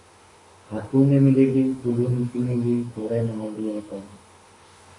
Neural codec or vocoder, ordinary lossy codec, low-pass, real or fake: autoencoder, 48 kHz, 32 numbers a frame, DAC-VAE, trained on Japanese speech; AAC, 32 kbps; 10.8 kHz; fake